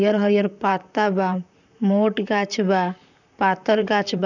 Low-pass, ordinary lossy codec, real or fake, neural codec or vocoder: 7.2 kHz; none; fake; vocoder, 44.1 kHz, 128 mel bands, Pupu-Vocoder